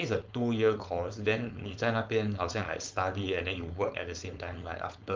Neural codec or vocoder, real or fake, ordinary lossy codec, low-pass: codec, 16 kHz, 4.8 kbps, FACodec; fake; Opus, 24 kbps; 7.2 kHz